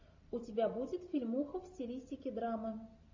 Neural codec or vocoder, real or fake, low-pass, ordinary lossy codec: none; real; 7.2 kHz; AAC, 48 kbps